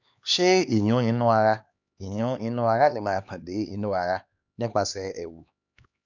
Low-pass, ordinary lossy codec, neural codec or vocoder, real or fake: 7.2 kHz; none; codec, 16 kHz, 2 kbps, X-Codec, HuBERT features, trained on LibriSpeech; fake